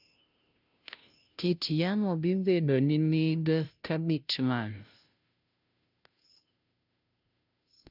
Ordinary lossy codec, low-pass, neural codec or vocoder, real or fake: none; 5.4 kHz; codec, 16 kHz, 0.5 kbps, FunCodec, trained on Chinese and English, 25 frames a second; fake